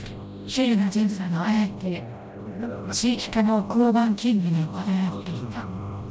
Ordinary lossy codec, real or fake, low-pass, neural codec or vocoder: none; fake; none; codec, 16 kHz, 0.5 kbps, FreqCodec, smaller model